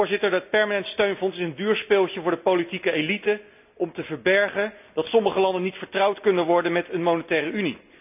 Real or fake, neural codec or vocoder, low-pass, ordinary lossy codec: real; none; 3.6 kHz; none